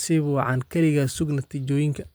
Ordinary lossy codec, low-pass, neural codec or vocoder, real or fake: none; none; vocoder, 44.1 kHz, 128 mel bands every 256 samples, BigVGAN v2; fake